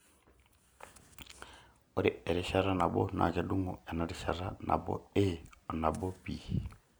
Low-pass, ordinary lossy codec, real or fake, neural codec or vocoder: none; none; real; none